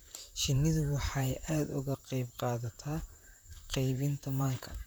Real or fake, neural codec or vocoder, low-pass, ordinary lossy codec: fake; vocoder, 44.1 kHz, 128 mel bands, Pupu-Vocoder; none; none